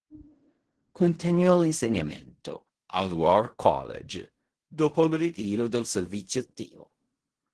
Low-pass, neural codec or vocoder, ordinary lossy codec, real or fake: 10.8 kHz; codec, 16 kHz in and 24 kHz out, 0.4 kbps, LongCat-Audio-Codec, fine tuned four codebook decoder; Opus, 16 kbps; fake